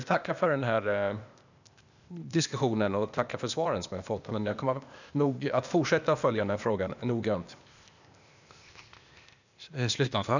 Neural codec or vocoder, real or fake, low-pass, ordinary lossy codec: codec, 16 kHz, 0.8 kbps, ZipCodec; fake; 7.2 kHz; none